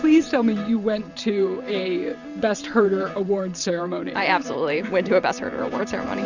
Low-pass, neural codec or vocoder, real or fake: 7.2 kHz; vocoder, 44.1 kHz, 128 mel bands every 512 samples, BigVGAN v2; fake